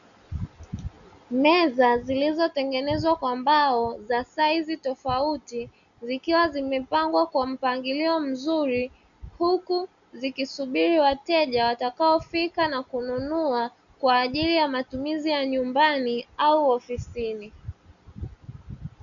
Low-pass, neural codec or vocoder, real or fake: 7.2 kHz; none; real